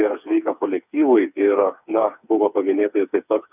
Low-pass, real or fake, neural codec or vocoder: 3.6 kHz; fake; codec, 16 kHz, 4 kbps, FreqCodec, smaller model